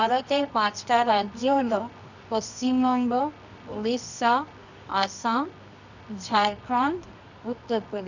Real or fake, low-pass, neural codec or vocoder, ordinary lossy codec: fake; 7.2 kHz; codec, 24 kHz, 0.9 kbps, WavTokenizer, medium music audio release; none